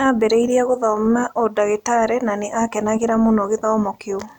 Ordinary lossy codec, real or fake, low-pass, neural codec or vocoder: none; real; 19.8 kHz; none